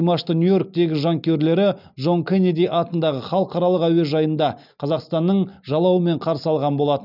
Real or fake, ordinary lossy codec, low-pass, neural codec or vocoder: real; none; 5.4 kHz; none